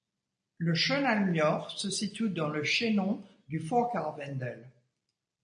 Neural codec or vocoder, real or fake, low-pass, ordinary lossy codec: none; real; 9.9 kHz; AAC, 64 kbps